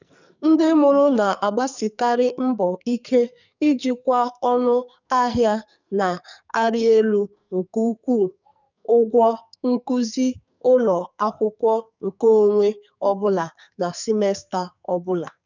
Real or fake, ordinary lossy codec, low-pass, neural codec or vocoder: fake; none; 7.2 kHz; codec, 44.1 kHz, 2.6 kbps, SNAC